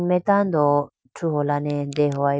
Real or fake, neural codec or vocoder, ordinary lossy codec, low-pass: real; none; none; none